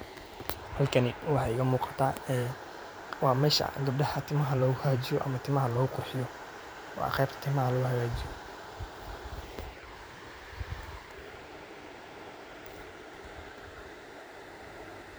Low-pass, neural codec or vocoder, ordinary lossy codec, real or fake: none; vocoder, 44.1 kHz, 128 mel bands every 512 samples, BigVGAN v2; none; fake